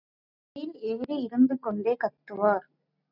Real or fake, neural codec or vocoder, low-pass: real; none; 5.4 kHz